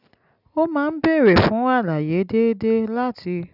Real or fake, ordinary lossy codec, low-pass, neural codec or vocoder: real; none; 5.4 kHz; none